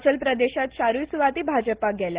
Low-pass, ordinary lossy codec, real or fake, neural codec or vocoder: 3.6 kHz; Opus, 16 kbps; real; none